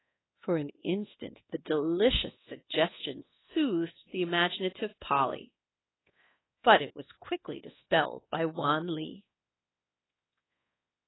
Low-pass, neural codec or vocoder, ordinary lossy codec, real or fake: 7.2 kHz; codec, 24 kHz, 1.2 kbps, DualCodec; AAC, 16 kbps; fake